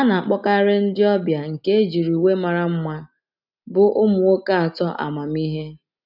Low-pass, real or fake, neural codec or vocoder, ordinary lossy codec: 5.4 kHz; real; none; none